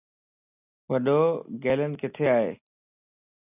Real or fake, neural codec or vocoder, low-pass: real; none; 3.6 kHz